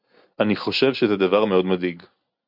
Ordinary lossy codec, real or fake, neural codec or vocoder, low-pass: MP3, 48 kbps; real; none; 5.4 kHz